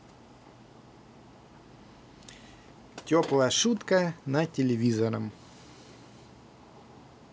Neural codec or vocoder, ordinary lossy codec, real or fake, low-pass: none; none; real; none